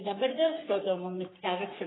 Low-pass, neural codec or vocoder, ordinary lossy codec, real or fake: 7.2 kHz; codec, 44.1 kHz, 3.4 kbps, Pupu-Codec; AAC, 16 kbps; fake